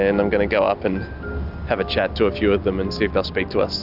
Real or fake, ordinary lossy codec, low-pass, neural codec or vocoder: real; Opus, 64 kbps; 5.4 kHz; none